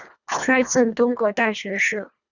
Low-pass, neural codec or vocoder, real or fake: 7.2 kHz; codec, 24 kHz, 1.5 kbps, HILCodec; fake